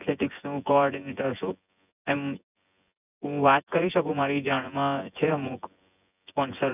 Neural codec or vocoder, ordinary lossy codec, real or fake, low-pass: vocoder, 24 kHz, 100 mel bands, Vocos; none; fake; 3.6 kHz